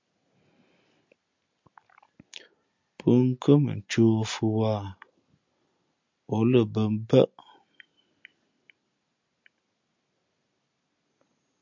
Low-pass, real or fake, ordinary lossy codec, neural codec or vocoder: 7.2 kHz; real; MP3, 64 kbps; none